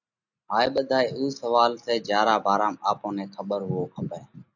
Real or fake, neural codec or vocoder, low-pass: real; none; 7.2 kHz